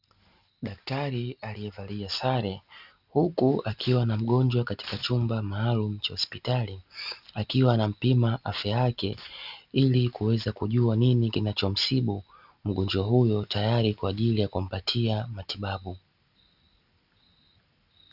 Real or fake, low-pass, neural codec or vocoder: real; 5.4 kHz; none